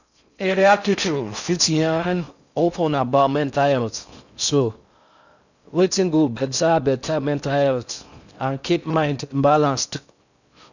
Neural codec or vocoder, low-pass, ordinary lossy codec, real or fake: codec, 16 kHz in and 24 kHz out, 0.6 kbps, FocalCodec, streaming, 4096 codes; 7.2 kHz; none; fake